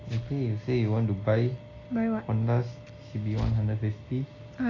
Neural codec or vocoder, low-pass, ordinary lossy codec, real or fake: none; 7.2 kHz; AAC, 32 kbps; real